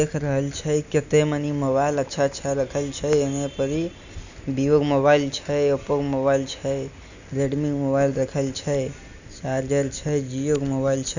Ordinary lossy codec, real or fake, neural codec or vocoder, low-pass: none; real; none; 7.2 kHz